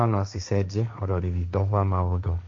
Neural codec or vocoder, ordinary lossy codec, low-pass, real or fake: codec, 16 kHz, 1.1 kbps, Voila-Tokenizer; MP3, 48 kbps; 7.2 kHz; fake